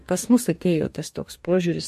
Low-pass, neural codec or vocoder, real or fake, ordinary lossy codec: 14.4 kHz; codec, 44.1 kHz, 2.6 kbps, DAC; fake; MP3, 64 kbps